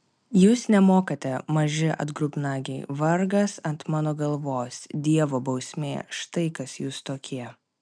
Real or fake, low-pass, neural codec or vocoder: real; 9.9 kHz; none